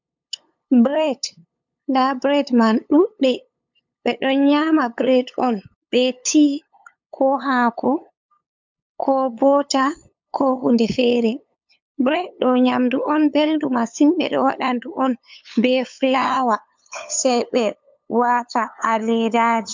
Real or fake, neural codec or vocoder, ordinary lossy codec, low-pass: fake; codec, 16 kHz, 8 kbps, FunCodec, trained on LibriTTS, 25 frames a second; MP3, 64 kbps; 7.2 kHz